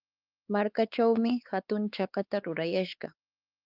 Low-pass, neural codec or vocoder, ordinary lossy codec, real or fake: 5.4 kHz; codec, 16 kHz, 4 kbps, X-Codec, WavLM features, trained on Multilingual LibriSpeech; Opus, 32 kbps; fake